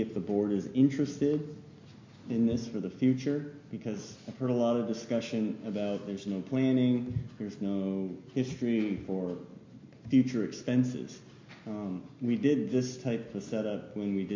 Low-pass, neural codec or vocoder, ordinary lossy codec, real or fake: 7.2 kHz; none; MP3, 48 kbps; real